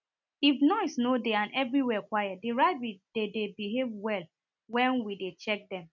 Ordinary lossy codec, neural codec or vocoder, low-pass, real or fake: none; none; 7.2 kHz; real